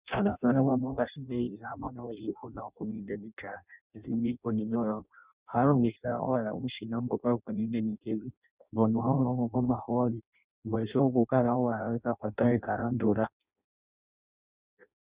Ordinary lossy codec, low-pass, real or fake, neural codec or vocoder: Opus, 64 kbps; 3.6 kHz; fake; codec, 16 kHz in and 24 kHz out, 0.6 kbps, FireRedTTS-2 codec